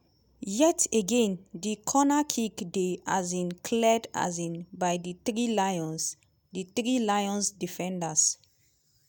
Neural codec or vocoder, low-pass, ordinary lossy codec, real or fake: none; none; none; real